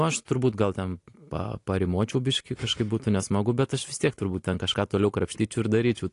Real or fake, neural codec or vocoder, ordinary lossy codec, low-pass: real; none; AAC, 48 kbps; 10.8 kHz